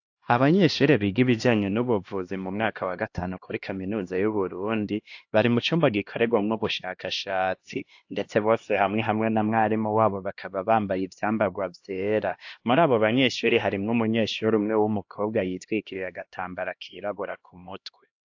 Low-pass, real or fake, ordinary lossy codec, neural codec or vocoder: 7.2 kHz; fake; AAC, 48 kbps; codec, 16 kHz, 1 kbps, X-Codec, HuBERT features, trained on LibriSpeech